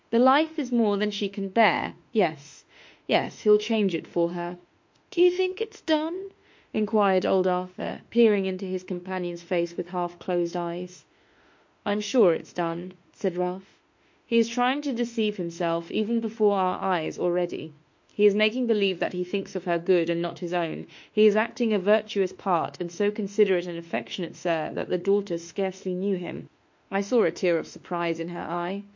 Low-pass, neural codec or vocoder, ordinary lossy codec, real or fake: 7.2 kHz; autoencoder, 48 kHz, 32 numbers a frame, DAC-VAE, trained on Japanese speech; MP3, 48 kbps; fake